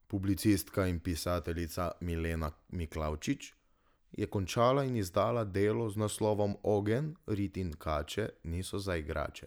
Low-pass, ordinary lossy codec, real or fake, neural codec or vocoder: none; none; real; none